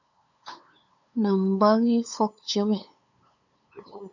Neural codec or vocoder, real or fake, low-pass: codec, 16 kHz, 8 kbps, FunCodec, trained on LibriTTS, 25 frames a second; fake; 7.2 kHz